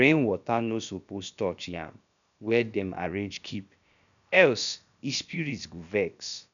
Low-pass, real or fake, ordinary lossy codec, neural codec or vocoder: 7.2 kHz; fake; none; codec, 16 kHz, about 1 kbps, DyCAST, with the encoder's durations